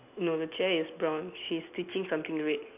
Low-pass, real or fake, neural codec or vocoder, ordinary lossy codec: 3.6 kHz; real; none; none